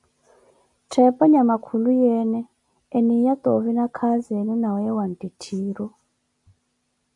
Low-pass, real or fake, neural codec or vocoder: 10.8 kHz; real; none